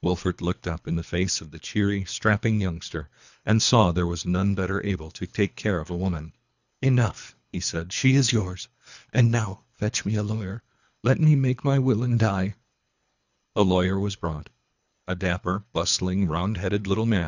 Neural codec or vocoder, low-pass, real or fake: codec, 24 kHz, 3 kbps, HILCodec; 7.2 kHz; fake